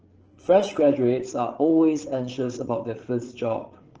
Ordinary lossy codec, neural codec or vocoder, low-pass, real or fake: Opus, 16 kbps; codec, 16 kHz, 8 kbps, FreqCodec, larger model; 7.2 kHz; fake